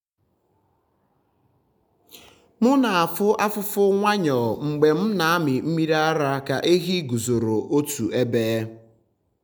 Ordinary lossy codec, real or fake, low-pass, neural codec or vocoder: none; real; none; none